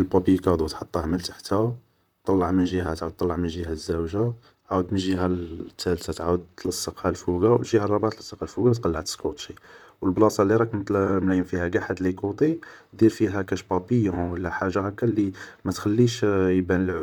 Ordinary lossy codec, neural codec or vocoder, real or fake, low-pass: none; vocoder, 44.1 kHz, 128 mel bands, Pupu-Vocoder; fake; 19.8 kHz